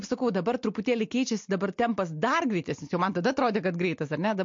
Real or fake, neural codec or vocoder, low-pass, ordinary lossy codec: real; none; 7.2 kHz; MP3, 48 kbps